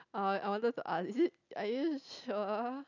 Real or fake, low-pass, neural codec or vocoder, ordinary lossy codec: real; 7.2 kHz; none; none